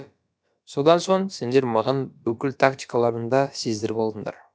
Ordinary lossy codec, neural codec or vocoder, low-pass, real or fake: none; codec, 16 kHz, about 1 kbps, DyCAST, with the encoder's durations; none; fake